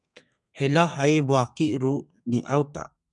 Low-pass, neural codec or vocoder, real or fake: 10.8 kHz; codec, 32 kHz, 1.9 kbps, SNAC; fake